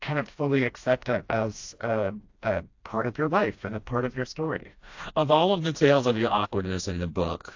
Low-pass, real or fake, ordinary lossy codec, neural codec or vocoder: 7.2 kHz; fake; AAC, 48 kbps; codec, 16 kHz, 1 kbps, FreqCodec, smaller model